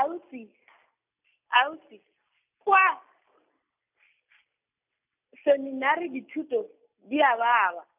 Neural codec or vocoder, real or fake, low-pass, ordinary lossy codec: none; real; 3.6 kHz; none